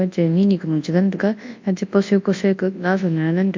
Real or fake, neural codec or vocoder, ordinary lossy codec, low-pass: fake; codec, 24 kHz, 0.9 kbps, WavTokenizer, large speech release; MP3, 48 kbps; 7.2 kHz